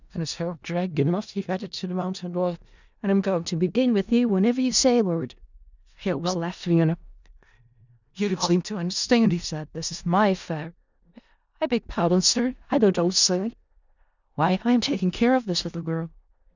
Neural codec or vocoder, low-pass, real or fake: codec, 16 kHz in and 24 kHz out, 0.4 kbps, LongCat-Audio-Codec, four codebook decoder; 7.2 kHz; fake